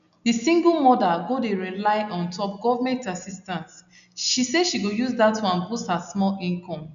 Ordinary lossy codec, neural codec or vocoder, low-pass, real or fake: none; none; 7.2 kHz; real